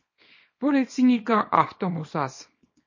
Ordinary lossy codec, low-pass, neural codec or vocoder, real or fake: MP3, 32 kbps; 7.2 kHz; codec, 24 kHz, 0.9 kbps, WavTokenizer, small release; fake